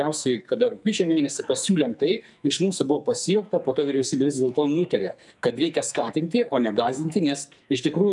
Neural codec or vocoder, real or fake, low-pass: codec, 44.1 kHz, 2.6 kbps, SNAC; fake; 10.8 kHz